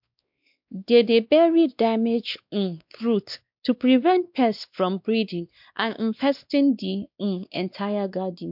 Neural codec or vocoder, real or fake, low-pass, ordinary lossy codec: codec, 16 kHz, 2 kbps, X-Codec, WavLM features, trained on Multilingual LibriSpeech; fake; 5.4 kHz; MP3, 48 kbps